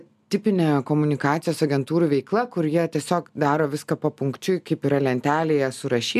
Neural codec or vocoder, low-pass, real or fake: none; 14.4 kHz; real